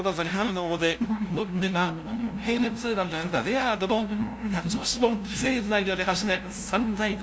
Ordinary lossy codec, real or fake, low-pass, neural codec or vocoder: none; fake; none; codec, 16 kHz, 0.5 kbps, FunCodec, trained on LibriTTS, 25 frames a second